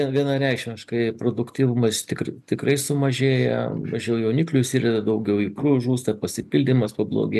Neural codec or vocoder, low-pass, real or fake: none; 14.4 kHz; real